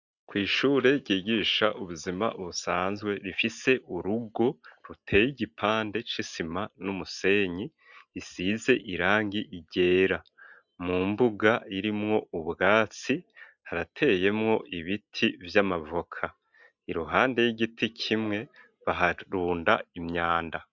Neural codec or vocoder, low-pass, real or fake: none; 7.2 kHz; real